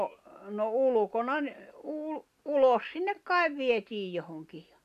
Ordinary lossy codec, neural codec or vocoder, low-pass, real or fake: none; none; 14.4 kHz; real